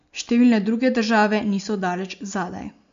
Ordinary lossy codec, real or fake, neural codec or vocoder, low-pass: MP3, 48 kbps; real; none; 7.2 kHz